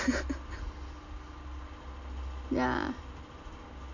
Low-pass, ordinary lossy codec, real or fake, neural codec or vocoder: 7.2 kHz; none; real; none